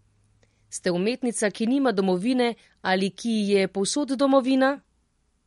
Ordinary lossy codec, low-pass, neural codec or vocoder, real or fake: MP3, 48 kbps; 19.8 kHz; none; real